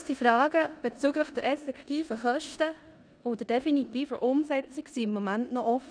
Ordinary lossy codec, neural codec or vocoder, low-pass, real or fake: none; codec, 16 kHz in and 24 kHz out, 0.9 kbps, LongCat-Audio-Codec, four codebook decoder; 9.9 kHz; fake